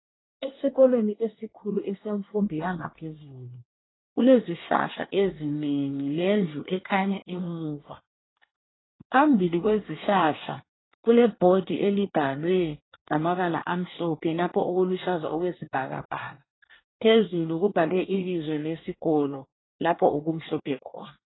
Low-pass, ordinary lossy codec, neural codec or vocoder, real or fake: 7.2 kHz; AAC, 16 kbps; codec, 24 kHz, 1 kbps, SNAC; fake